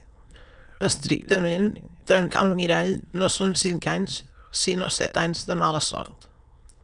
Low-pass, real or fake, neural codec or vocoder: 9.9 kHz; fake; autoencoder, 22.05 kHz, a latent of 192 numbers a frame, VITS, trained on many speakers